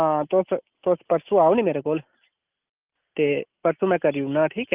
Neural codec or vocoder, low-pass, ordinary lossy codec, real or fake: none; 3.6 kHz; Opus, 24 kbps; real